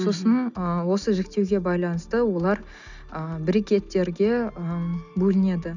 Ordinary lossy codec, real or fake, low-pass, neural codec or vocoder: none; real; 7.2 kHz; none